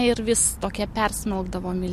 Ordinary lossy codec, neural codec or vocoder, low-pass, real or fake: MP3, 64 kbps; none; 14.4 kHz; real